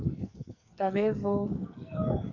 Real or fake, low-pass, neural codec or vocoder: fake; 7.2 kHz; codec, 44.1 kHz, 2.6 kbps, SNAC